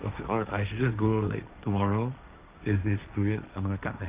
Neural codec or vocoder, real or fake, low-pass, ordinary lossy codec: codec, 16 kHz, 1.1 kbps, Voila-Tokenizer; fake; 3.6 kHz; Opus, 32 kbps